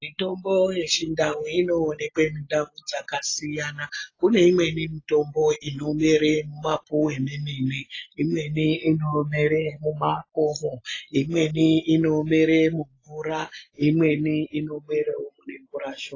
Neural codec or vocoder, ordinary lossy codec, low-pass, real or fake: none; AAC, 32 kbps; 7.2 kHz; real